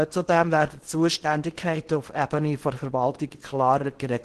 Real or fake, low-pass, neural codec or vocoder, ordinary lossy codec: fake; 10.8 kHz; codec, 16 kHz in and 24 kHz out, 0.8 kbps, FocalCodec, streaming, 65536 codes; Opus, 16 kbps